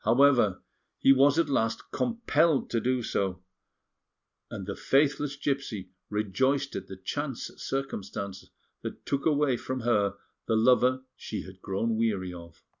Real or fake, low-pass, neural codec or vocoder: real; 7.2 kHz; none